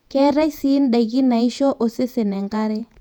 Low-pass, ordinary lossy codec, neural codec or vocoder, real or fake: 19.8 kHz; none; vocoder, 48 kHz, 128 mel bands, Vocos; fake